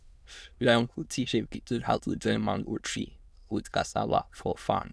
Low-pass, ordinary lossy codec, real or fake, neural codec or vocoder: none; none; fake; autoencoder, 22.05 kHz, a latent of 192 numbers a frame, VITS, trained on many speakers